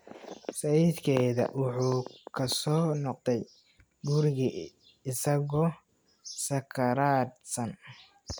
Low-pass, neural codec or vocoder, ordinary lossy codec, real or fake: none; none; none; real